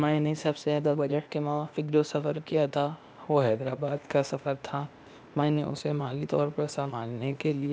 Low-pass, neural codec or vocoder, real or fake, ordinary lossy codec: none; codec, 16 kHz, 0.8 kbps, ZipCodec; fake; none